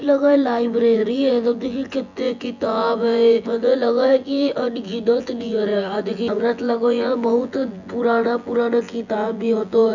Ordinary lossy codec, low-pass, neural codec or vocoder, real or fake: none; 7.2 kHz; vocoder, 24 kHz, 100 mel bands, Vocos; fake